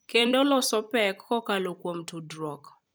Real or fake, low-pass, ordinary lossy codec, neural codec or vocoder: fake; none; none; vocoder, 44.1 kHz, 128 mel bands every 256 samples, BigVGAN v2